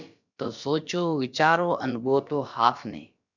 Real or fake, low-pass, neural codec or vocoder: fake; 7.2 kHz; codec, 16 kHz, about 1 kbps, DyCAST, with the encoder's durations